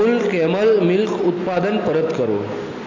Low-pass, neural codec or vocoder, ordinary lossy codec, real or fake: 7.2 kHz; none; AAC, 32 kbps; real